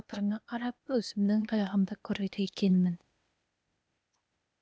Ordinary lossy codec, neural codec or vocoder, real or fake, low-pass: none; codec, 16 kHz, 0.8 kbps, ZipCodec; fake; none